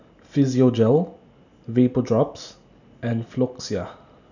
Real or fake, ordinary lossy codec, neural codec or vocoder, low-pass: real; none; none; 7.2 kHz